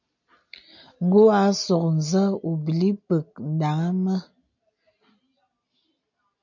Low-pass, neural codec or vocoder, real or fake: 7.2 kHz; none; real